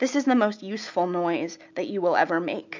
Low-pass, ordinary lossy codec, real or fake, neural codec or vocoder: 7.2 kHz; MP3, 64 kbps; real; none